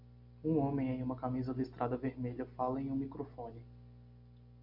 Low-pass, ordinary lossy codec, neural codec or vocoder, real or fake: 5.4 kHz; MP3, 48 kbps; none; real